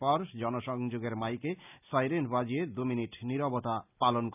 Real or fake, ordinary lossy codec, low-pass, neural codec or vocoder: real; none; 3.6 kHz; none